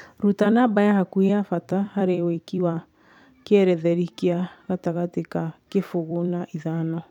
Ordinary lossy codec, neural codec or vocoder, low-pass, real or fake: none; vocoder, 44.1 kHz, 128 mel bands every 256 samples, BigVGAN v2; 19.8 kHz; fake